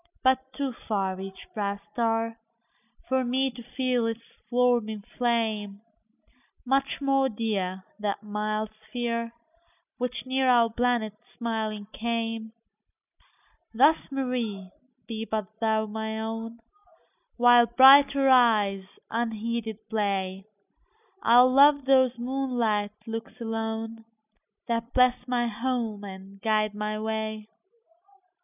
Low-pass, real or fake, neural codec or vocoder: 3.6 kHz; real; none